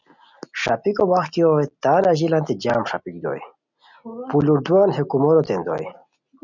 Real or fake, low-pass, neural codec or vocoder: real; 7.2 kHz; none